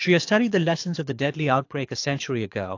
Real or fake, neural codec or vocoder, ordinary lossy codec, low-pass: fake; codec, 24 kHz, 6 kbps, HILCodec; AAC, 48 kbps; 7.2 kHz